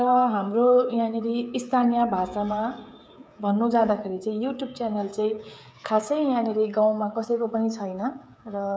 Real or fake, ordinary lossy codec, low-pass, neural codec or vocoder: fake; none; none; codec, 16 kHz, 16 kbps, FreqCodec, smaller model